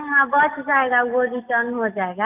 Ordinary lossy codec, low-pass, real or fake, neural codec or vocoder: none; 3.6 kHz; real; none